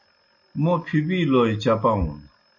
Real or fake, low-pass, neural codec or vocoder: real; 7.2 kHz; none